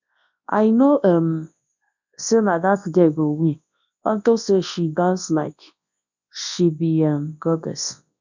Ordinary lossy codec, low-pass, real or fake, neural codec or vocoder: none; 7.2 kHz; fake; codec, 24 kHz, 0.9 kbps, WavTokenizer, large speech release